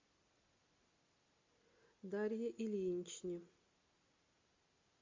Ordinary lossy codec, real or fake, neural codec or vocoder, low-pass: MP3, 48 kbps; real; none; 7.2 kHz